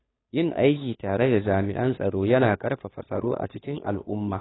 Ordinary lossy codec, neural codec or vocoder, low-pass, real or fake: AAC, 16 kbps; codec, 16 kHz, 2 kbps, FunCodec, trained on Chinese and English, 25 frames a second; 7.2 kHz; fake